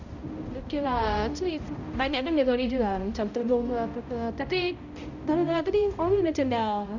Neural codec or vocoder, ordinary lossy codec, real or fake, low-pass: codec, 16 kHz, 0.5 kbps, X-Codec, HuBERT features, trained on balanced general audio; none; fake; 7.2 kHz